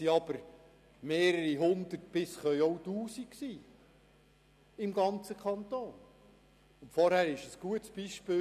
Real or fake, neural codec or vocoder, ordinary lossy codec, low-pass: real; none; none; 14.4 kHz